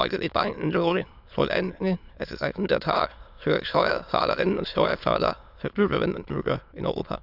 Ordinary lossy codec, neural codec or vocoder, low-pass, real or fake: Opus, 64 kbps; autoencoder, 22.05 kHz, a latent of 192 numbers a frame, VITS, trained on many speakers; 5.4 kHz; fake